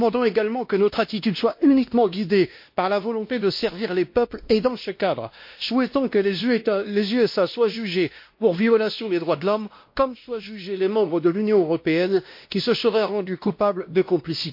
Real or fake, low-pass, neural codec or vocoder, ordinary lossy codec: fake; 5.4 kHz; codec, 16 kHz, 1 kbps, X-Codec, WavLM features, trained on Multilingual LibriSpeech; MP3, 32 kbps